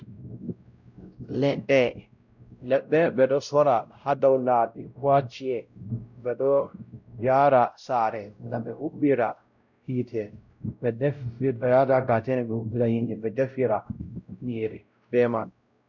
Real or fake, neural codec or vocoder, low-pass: fake; codec, 16 kHz, 0.5 kbps, X-Codec, WavLM features, trained on Multilingual LibriSpeech; 7.2 kHz